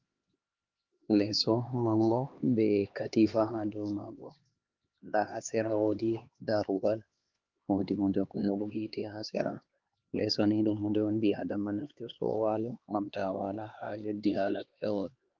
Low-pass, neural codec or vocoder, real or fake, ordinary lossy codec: 7.2 kHz; codec, 16 kHz, 2 kbps, X-Codec, HuBERT features, trained on LibriSpeech; fake; Opus, 32 kbps